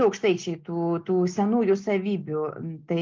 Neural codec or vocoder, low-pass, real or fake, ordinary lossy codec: none; 7.2 kHz; real; Opus, 32 kbps